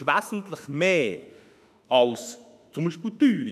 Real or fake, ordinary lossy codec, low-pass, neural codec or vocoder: fake; none; 14.4 kHz; autoencoder, 48 kHz, 32 numbers a frame, DAC-VAE, trained on Japanese speech